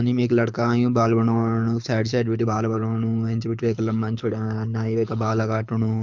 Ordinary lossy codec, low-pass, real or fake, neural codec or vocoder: MP3, 64 kbps; 7.2 kHz; fake; codec, 24 kHz, 6 kbps, HILCodec